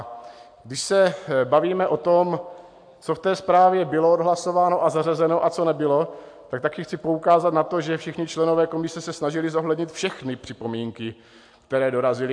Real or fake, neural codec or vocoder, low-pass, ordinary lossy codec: real; none; 9.9 kHz; AAC, 64 kbps